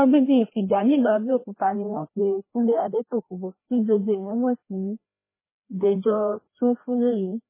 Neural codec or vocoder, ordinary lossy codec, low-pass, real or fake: codec, 16 kHz, 1 kbps, FreqCodec, larger model; MP3, 16 kbps; 3.6 kHz; fake